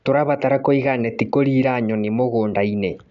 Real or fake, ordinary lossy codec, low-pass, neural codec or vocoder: real; none; 7.2 kHz; none